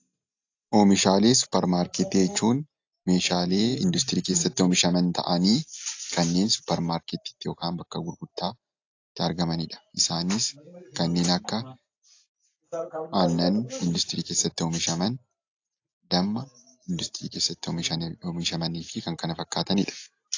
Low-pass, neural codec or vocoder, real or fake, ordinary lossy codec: 7.2 kHz; none; real; AAC, 48 kbps